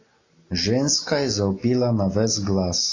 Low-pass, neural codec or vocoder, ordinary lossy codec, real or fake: 7.2 kHz; none; AAC, 32 kbps; real